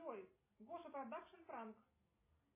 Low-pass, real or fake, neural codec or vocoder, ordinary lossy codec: 3.6 kHz; real; none; MP3, 16 kbps